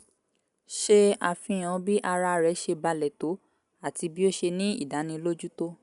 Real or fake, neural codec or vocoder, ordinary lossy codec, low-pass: real; none; none; 10.8 kHz